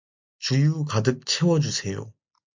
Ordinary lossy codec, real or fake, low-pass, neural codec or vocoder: MP3, 64 kbps; real; 7.2 kHz; none